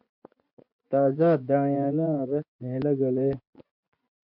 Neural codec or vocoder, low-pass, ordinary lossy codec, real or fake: vocoder, 24 kHz, 100 mel bands, Vocos; 5.4 kHz; MP3, 48 kbps; fake